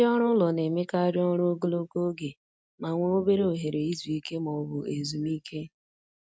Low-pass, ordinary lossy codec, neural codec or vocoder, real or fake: none; none; none; real